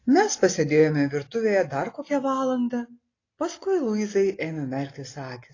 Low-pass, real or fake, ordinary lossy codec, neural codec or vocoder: 7.2 kHz; real; AAC, 32 kbps; none